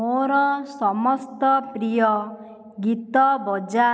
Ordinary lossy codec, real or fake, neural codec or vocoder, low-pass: none; real; none; none